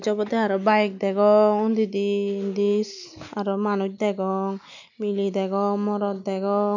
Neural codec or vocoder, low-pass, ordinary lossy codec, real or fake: none; 7.2 kHz; none; real